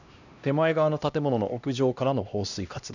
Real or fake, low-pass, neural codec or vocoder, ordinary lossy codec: fake; 7.2 kHz; codec, 16 kHz, 1 kbps, X-Codec, HuBERT features, trained on LibriSpeech; none